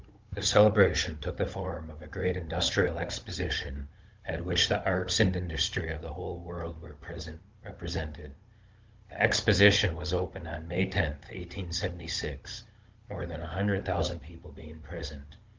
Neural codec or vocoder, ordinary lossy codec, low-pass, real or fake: codec, 16 kHz, 16 kbps, FunCodec, trained on Chinese and English, 50 frames a second; Opus, 32 kbps; 7.2 kHz; fake